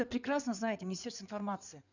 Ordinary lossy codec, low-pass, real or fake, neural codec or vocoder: none; 7.2 kHz; fake; codec, 16 kHz in and 24 kHz out, 2.2 kbps, FireRedTTS-2 codec